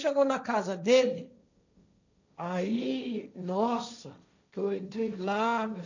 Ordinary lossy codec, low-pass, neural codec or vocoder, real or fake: none; 7.2 kHz; codec, 16 kHz, 1.1 kbps, Voila-Tokenizer; fake